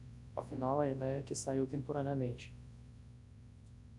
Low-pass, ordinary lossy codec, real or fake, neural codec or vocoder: 10.8 kHz; AAC, 64 kbps; fake; codec, 24 kHz, 0.9 kbps, WavTokenizer, large speech release